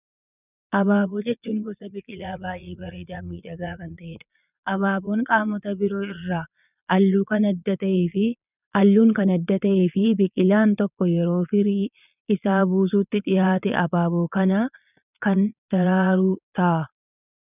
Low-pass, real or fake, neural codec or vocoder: 3.6 kHz; fake; vocoder, 22.05 kHz, 80 mel bands, Vocos